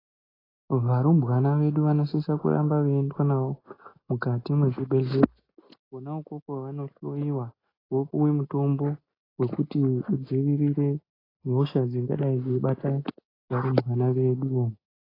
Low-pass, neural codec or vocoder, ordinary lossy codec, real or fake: 5.4 kHz; none; AAC, 24 kbps; real